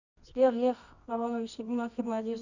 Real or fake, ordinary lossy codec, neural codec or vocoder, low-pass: fake; Opus, 64 kbps; codec, 24 kHz, 0.9 kbps, WavTokenizer, medium music audio release; 7.2 kHz